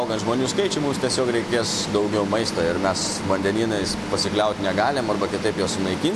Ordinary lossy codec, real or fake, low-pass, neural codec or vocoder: AAC, 64 kbps; real; 14.4 kHz; none